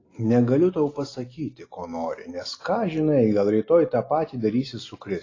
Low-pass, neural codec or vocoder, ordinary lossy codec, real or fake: 7.2 kHz; none; AAC, 32 kbps; real